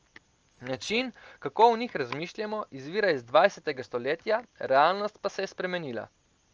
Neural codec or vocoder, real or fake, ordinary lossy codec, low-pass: none; real; Opus, 24 kbps; 7.2 kHz